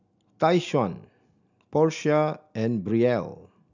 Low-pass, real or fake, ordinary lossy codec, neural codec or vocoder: 7.2 kHz; real; none; none